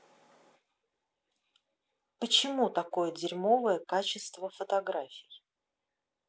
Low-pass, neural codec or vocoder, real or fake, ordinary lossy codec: none; none; real; none